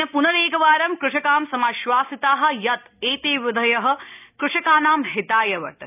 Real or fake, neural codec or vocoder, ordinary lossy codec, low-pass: real; none; none; 3.6 kHz